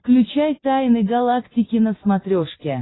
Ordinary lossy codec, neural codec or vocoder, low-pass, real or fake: AAC, 16 kbps; none; 7.2 kHz; real